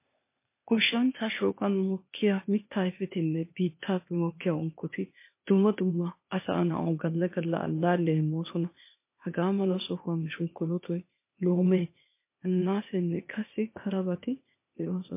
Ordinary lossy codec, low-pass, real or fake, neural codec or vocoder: MP3, 24 kbps; 3.6 kHz; fake; codec, 16 kHz, 0.8 kbps, ZipCodec